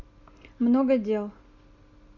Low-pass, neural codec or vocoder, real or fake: 7.2 kHz; none; real